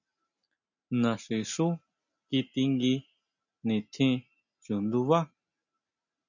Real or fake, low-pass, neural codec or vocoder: real; 7.2 kHz; none